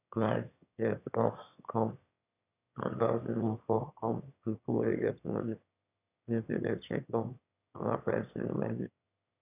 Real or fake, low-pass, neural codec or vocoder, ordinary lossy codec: fake; 3.6 kHz; autoencoder, 22.05 kHz, a latent of 192 numbers a frame, VITS, trained on one speaker; none